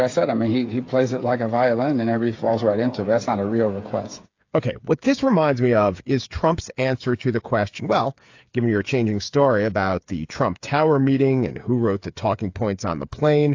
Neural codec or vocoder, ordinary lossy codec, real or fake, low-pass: codec, 16 kHz, 8 kbps, FreqCodec, smaller model; AAC, 48 kbps; fake; 7.2 kHz